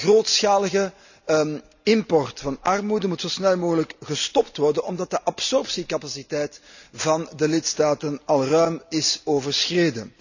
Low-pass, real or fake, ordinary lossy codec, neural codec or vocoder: 7.2 kHz; real; none; none